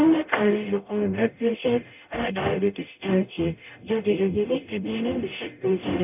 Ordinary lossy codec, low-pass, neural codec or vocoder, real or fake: none; 3.6 kHz; codec, 44.1 kHz, 0.9 kbps, DAC; fake